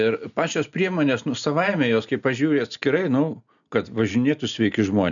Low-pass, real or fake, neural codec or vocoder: 7.2 kHz; real; none